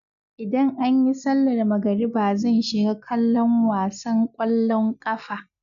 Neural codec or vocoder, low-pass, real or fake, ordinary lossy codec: none; 7.2 kHz; real; none